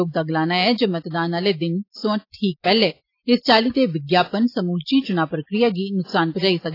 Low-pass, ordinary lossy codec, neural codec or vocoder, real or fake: 5.4 kHz; AAC, 32 kbps; none; real